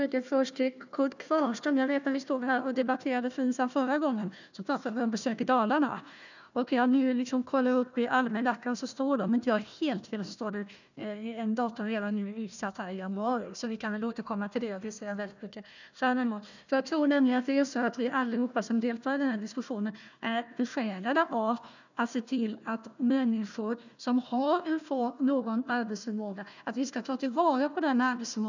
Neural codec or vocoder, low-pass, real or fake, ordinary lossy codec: codec, 16 kHz, 1 kbps, FunCodec, trained on Chinese and English, 50 frames a second; 7.2 kHz; fake; none